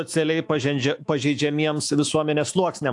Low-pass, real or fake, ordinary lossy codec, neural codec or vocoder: 10.8 kHz; fake; AAC, 64 kbps; autoencoder, 48 kHz, 128 numbers a frame, DAC-VAE, trained on Japanese speech